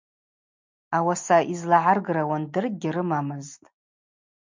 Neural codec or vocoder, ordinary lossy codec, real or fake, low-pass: none; MP3, 64 kbps; real; 7.2 kHz